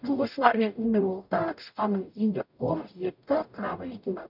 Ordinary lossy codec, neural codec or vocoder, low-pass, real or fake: none; codec, 44.1 kHz, 0.9 kbps, DAC; 5.4 kHz; fake